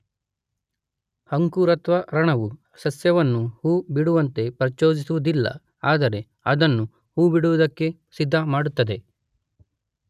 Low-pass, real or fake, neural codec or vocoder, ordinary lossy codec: 14.4 kHz; real; none; none